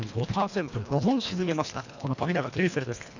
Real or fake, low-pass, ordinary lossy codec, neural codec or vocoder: fake; 7.2 kHz; none; codec, 24 kHz, 1.5 kbps, HILCodec